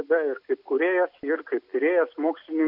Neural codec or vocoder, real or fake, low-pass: none; real; 5.4 kHz